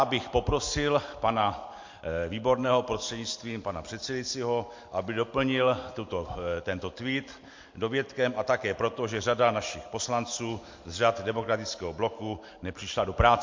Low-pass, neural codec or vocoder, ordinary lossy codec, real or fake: 7.2 kHz; none; MP3, 48 kbps; real